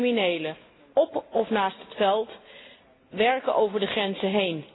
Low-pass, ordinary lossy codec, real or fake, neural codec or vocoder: 7.2 kHz; AAC, 16 kbps; real; none